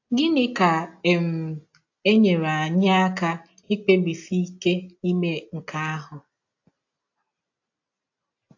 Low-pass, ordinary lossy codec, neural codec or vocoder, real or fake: 7.2 kHz; AAC, 48 kbps; none; real